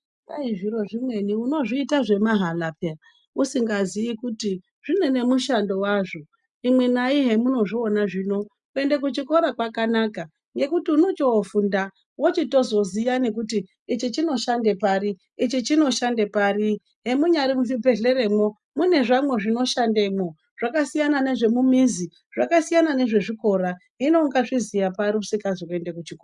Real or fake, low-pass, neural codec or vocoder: real; 10.8 kHz; none